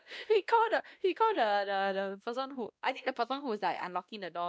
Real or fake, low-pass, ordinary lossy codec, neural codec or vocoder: fake; none; none; codec, 16 kHz, 1 kbps, X-Codec, WavLM features, trained on Multilingual LibriSpeech